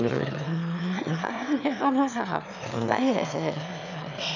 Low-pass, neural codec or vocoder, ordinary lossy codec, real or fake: 7.2 kHz; autoencoder, 22.05 kHz, a latent of 192 numbers a frame, VITS, trained on one speaker; none; fake